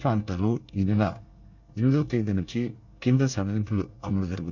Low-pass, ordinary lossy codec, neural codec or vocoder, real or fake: 7.2 kHz; none; codec, 24 kHz, 1 kbps, SNAC; fake